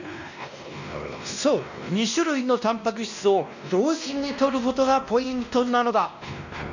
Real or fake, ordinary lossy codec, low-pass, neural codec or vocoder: fake; none; 7.2 kHz; codec, 16 kHz, 1 kbps, X-Codec, WavLM features, trained on Multilingual LibriSpeech